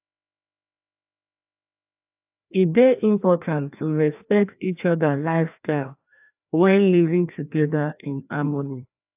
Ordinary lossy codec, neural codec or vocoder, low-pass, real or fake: AAC, 32 kbps; codec, 16 kHz, 1 kbps, FreqCodec, larger model; 3.6 kHz; fake